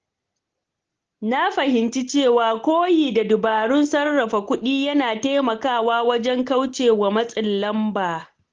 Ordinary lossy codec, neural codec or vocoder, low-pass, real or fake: Opus, 16 kbps; none; 7.2 kHz; real